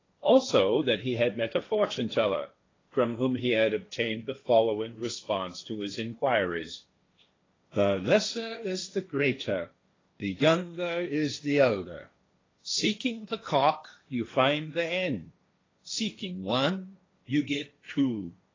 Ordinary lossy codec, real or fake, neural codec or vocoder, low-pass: AAC, 32 kbps; fake; codec, 16 kHz, 1.1 kbps, Voila-Tokenizer; 7.2 kHz